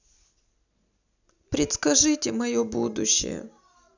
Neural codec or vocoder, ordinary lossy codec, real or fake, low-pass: none; none; real; 7.2 kHz